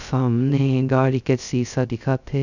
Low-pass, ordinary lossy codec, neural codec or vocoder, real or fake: 7.2 kHz; none; codec, 16 kHz, 0.2 kbps, FocalCodec; fake